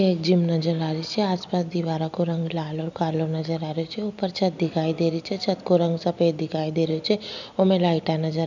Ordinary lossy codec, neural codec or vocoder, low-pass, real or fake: none; none; 7.2 kHz; real